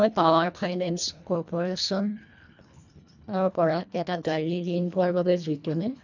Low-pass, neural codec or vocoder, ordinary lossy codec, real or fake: 7.2 kHz; codec, 24 kHz, 1.5 kbps, HILCodec; none; fake